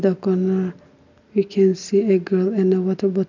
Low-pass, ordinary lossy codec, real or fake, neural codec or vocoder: 7.2 kHz; none; real; none